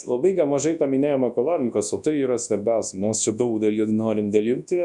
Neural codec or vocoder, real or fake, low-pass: codec, 24 kHz, 0.9 kbps, WavTokenizer, large speech release; fake; 10.8 kHz